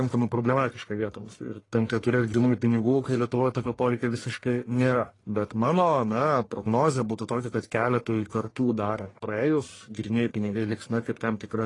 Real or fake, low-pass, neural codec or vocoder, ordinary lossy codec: fake; 10.8 kHz; codec, 44.1 kHz, 1.7 kbps, Pupu-Codec; AAC, 32 kbps